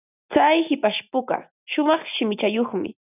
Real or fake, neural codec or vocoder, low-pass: real; none; 3.6 kHz